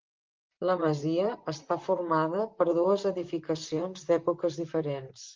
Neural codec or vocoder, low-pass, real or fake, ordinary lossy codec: vocoder, 44.1 kHz, 128 mel bands, Pupu-Vocoder; 7.2 kHz; fake; Opus, 32 kbps